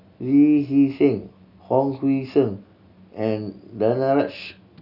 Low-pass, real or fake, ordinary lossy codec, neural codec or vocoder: 5.4 kHz; real; none; none